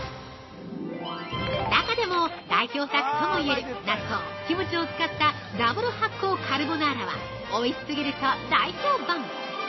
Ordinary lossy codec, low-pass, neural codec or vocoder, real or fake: MP3, 24 kbps; 7.2 kHz; none; real